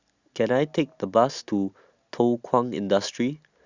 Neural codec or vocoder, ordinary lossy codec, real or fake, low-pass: none; Opus, 64 kbps; real; 7.2 kHz